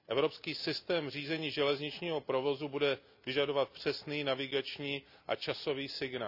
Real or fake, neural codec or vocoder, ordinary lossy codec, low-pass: real; none; none; 5.4 kHz